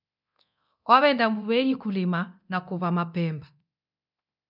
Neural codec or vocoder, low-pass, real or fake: codec, 24 kHz, 0.9 kbps, DualCodec; 5.4 kHz; fake